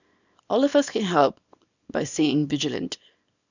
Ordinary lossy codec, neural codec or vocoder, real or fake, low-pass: none; codec, 24 kHz, 0.9 kbps, WavTokenizer, small release; fake; 7.2 kHz